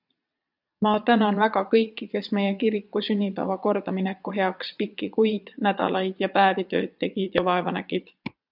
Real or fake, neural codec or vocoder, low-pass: fake; vocoder, 22.05 kHz, 80 mel bands, Vocos; 5.4 kHz